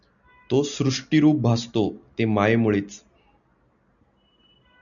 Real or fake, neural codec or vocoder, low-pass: real; none; 7.2 kHz